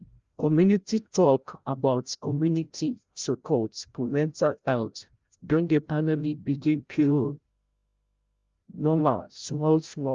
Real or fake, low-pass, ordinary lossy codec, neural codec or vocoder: fake; 7.2 kHz; Opus, 32 kbps; codec, 16 kHz, 0.5 kbps, FreqCodec, larger model